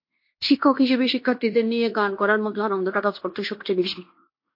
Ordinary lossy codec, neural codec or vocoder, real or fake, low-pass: MP3, 32 kbps; codec, 16 kHz in and 24 kHz out, 0.9 kbps, LongCat-Audio-Codec, fine tuned four codebook decoder; fake; 5.4 kHz